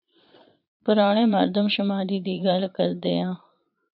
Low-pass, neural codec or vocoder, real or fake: 5.4 kHz; vocoder, 22.05 kHz, 80 mel bands, Vocos; fake